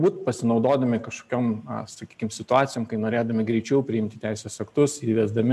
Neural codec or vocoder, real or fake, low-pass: none; real; 14.4 kHz